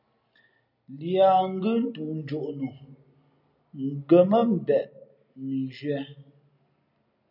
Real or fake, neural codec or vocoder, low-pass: real; none; 5.4 kHz